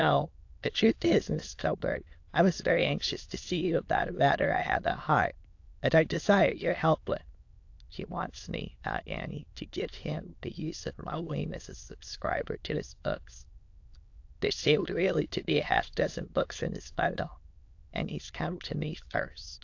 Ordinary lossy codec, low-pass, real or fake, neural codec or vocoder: AAC, 48 kbps; 7.2 kHz; fake; autoencoder, 22.05 kHz, a latent of 192 numbers a frame, VITS, trained on many speakers